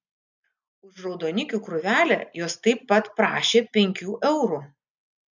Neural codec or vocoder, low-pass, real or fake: none; 7.2 kHz; real